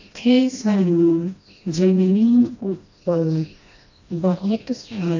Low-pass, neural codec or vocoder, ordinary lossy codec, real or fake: 7.2 kHz; codec, 16 kHz, 1 kbps, FreqCodec, smaller model; AAC, 48 kbps; fake